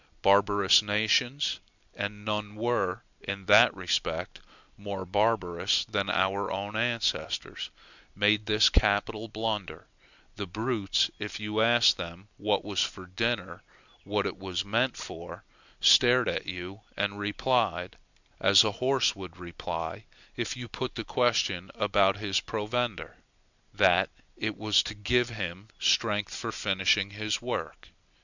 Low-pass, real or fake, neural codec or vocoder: 7.2 kHz; real; none